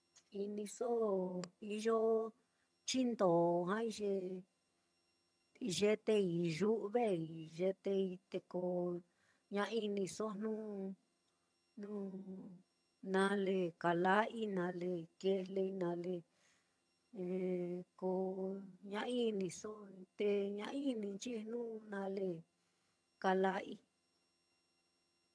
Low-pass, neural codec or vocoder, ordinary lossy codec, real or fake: none; vocoder, 22.05 kHz, 80 mel bands, HiFi-GAN; none; fake